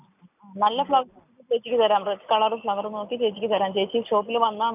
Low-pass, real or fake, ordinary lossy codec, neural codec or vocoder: 3.6 kHz; real; none; none